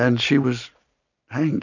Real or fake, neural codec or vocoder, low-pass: real; none; 7.2 kHz